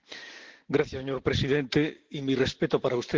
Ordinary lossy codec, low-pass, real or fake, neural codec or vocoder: Opus, 16 kbps; 7.2 kHz; real; none